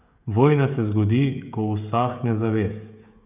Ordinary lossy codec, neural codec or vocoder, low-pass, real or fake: none; codec, 16 kHz, 16 kbps, FreqCodec, smaller model; 3.6 kHz; fake